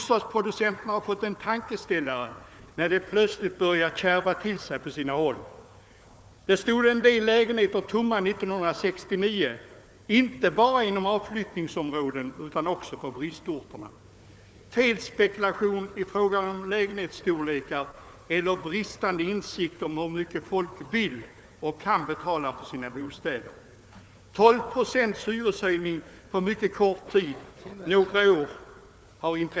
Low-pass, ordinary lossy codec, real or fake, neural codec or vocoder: none; none; fake; codec, 16 kHz, 4 kbps, FunCodec, trained on Chinese and English, 50 frames a second